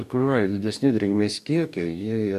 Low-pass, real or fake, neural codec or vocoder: 14.4 kHz; fake; codec, 44.1 kHz, 2.6 kbps, DAC